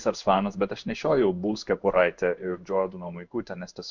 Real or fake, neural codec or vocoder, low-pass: fake; codec, 16 kHz in and 24 kHz out, 1 kbps, XY-Tokenizer; 7.2 kHz